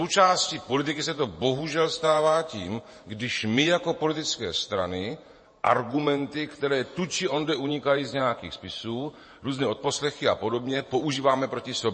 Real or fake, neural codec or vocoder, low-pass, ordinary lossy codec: fake; vocoder, 48 kHz, 128 mel bands, Vocos; 9.9 kHz; MP3, 32 kbps